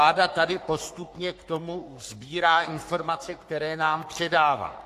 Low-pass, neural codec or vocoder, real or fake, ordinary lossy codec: 14.4 kHz; codec, 44.1 kHz, 3.4 kbps, Pupu-Codec; fake; AAC, 64 kbps